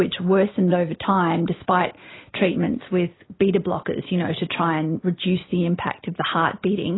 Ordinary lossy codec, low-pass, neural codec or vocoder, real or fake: AAC, 16 kbps; 7.2 kHz; none; real